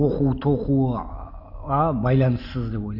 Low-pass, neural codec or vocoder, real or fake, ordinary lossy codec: 5.4 kHz; none; real; none